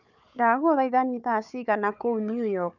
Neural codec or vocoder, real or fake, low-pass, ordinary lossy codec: codec, 16 kHz, 4 kbps, FunCodec, trained on Chinese and English, 50 frames a second; fake; 7.2 kHz; none